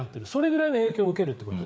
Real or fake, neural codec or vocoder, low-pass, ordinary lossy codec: fake; codec, 16 kHz, 4 kbps, FreqCodec, larger model; none; none